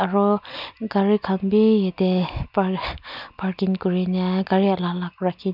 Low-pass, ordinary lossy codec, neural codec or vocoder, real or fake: 5.4 kHz; none; none; real